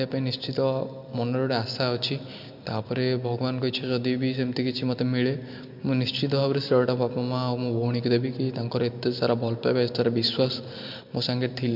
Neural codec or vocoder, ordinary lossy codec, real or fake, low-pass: none; MP3, 48 kbps; real; 5.4 kHz